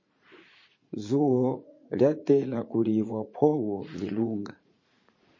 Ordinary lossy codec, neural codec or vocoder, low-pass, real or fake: MP3, 32 kbps; vocoder, 44.1 kHz, 80 mel bands, Vocos; 7.2 kHz; fake